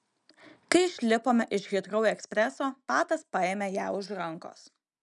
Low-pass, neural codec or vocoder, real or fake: 10.8 kHz; none; real